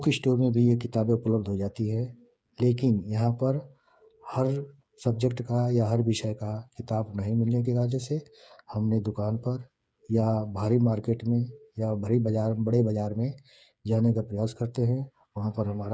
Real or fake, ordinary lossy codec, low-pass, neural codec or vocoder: fake; none; none; codec, 16 kHz, 8 kbps, FreqCodec, smaller model